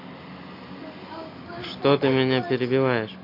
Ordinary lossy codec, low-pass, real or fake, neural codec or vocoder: AAC, 32 kbps; 5.4 kHz; real; none